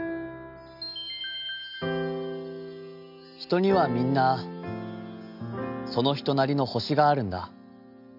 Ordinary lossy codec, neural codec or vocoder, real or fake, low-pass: none; none; real; 5.4 kHz